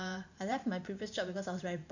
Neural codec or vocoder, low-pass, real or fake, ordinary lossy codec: vocoder, 44.1 kHz, 128 mel bands every 512 samples, BigVGAN v2; 7.2 kHz; fake; none